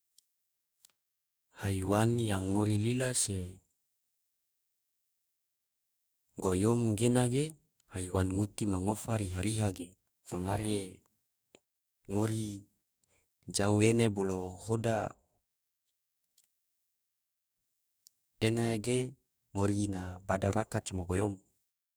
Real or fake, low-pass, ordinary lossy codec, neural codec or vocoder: fake; none; none; codec, 44.1 kHz, 2.6 kbps, DAC